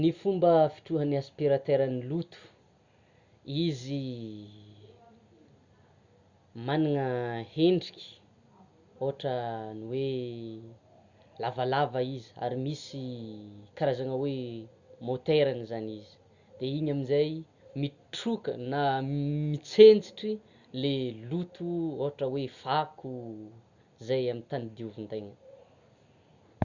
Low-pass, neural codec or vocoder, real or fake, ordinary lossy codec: 7.2 kHz; none; real; Opus, 64 kbps